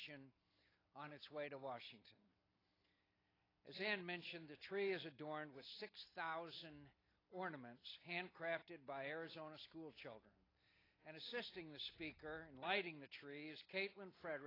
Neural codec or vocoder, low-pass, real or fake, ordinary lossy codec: none; 5.4 kHz; real; AAC, 24 kbps